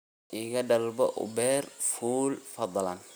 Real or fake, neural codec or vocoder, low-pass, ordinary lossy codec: real; none; none; none